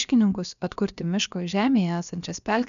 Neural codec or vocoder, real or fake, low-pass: codec, 16 kHz, about 1 kbps, DyCAST, with the encoder's durations; fake; 7.2 kHz